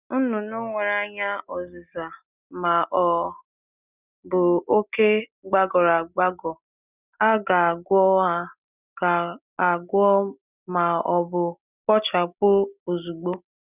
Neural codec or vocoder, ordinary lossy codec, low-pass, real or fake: none; none; 3.6 kHz; real